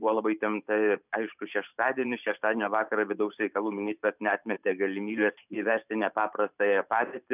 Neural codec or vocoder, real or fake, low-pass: vocoder, 44.1 kHz, 128 mel bands every 512 samples, BigVGAN v2; fake; 3.6 kHz